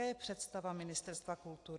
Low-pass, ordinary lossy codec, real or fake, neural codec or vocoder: 10.8 kHz; AAC, 48 kbps; real; none